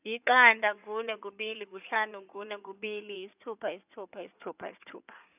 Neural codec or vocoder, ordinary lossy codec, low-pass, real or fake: codec, 16 kHz in and 24 kHz out, 2.2 kbps, FireRedTTS-2 codec; none; 3.6 kHz; fake